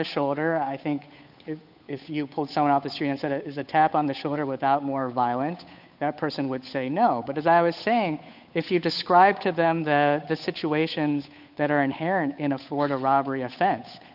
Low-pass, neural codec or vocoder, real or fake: 5.4 kHz; codec, 16 kHz, 8 kbps, FunCodec, trained on Chinese and English, 25 frames a second; fake